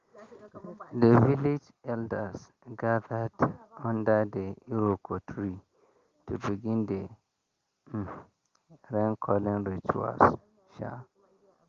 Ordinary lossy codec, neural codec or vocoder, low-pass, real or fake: Opus, 32 kbps; none; 7.2 kHz; real